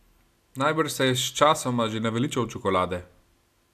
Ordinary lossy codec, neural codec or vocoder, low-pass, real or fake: AAC, 96 kbps; none; 14.4 kHz; real